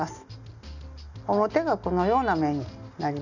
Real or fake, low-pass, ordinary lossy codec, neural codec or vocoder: real; 7.2 kHz; none; none